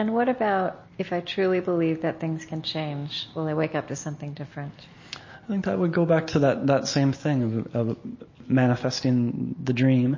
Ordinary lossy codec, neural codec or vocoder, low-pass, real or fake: MP3, 32 kbps; none; 7.2 kHz; real